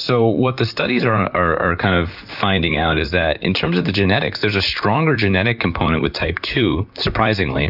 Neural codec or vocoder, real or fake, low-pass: vocoder, 44.1 kHz, 128 mel bands, Pupu-Vocoder; fake; 5.4 kHz